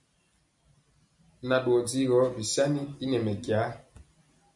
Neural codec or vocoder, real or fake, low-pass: none; real; 10.8 kHz